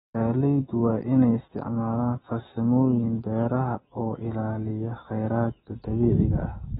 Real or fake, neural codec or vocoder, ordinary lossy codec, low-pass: fake; autoencoder, 48 kHz, 128 numbers a frame, DAC-VAE, trained on Japanese speech; AAC, 16 kbps; 19.8 kHz